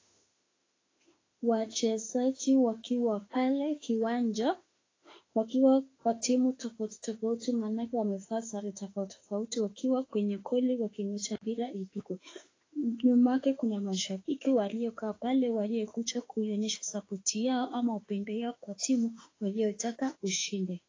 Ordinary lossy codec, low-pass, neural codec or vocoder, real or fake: AAC, 32 kbps; 7.2 kHz; autoencoder, 48 kHz, 32 numbers a frame, DAC-VAE, trained on Japanese speech; fake